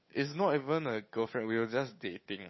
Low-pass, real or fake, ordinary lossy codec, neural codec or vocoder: 7.2 kHz; fake; MP3, 24 kbps; codec, 16 kHz, 8 kbps, FunCodec, trained on Chinese and English, 25 frames a second